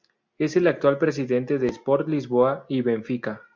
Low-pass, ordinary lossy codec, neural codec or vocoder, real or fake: 7.2 kHz; MP3, 64 kbps; none; real